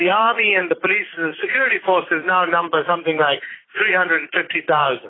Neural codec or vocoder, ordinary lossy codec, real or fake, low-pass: vocoder, 44.1 kHz, 80 mel bands, Vocos; AAC, 16 kbps; fake; 7.2 kHz